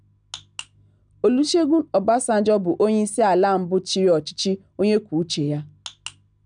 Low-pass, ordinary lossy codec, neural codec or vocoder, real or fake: 9.9 kHz; none; none; real